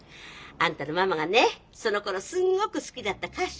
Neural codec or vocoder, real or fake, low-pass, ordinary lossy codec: none; real; none; none